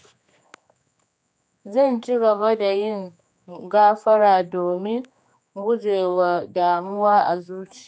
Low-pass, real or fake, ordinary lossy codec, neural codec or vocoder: none; fake; none; codec, 16 kHz, 2 kbps, X-Codec, HuBERT features, trained on general audio